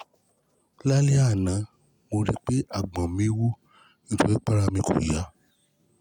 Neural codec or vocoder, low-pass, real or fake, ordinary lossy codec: none; 19.8 kHz; real; none